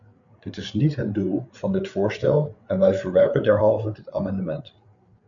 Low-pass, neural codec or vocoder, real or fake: 7.2 kHz; codec, 16 kHz, 8 kbps, FreqCodec, larger model; fake